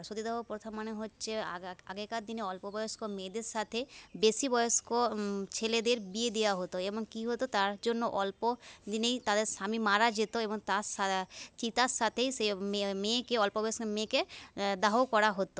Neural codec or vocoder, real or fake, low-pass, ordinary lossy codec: none; real; none; none